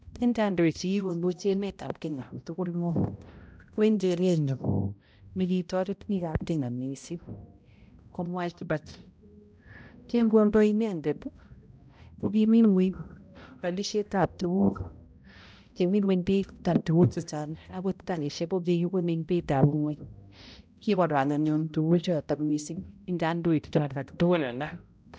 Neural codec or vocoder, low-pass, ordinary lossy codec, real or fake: codec, 16 kHz, 0.5 kbps, X-Codec, HuBERT features, trained on balanced general audio; none; none; fake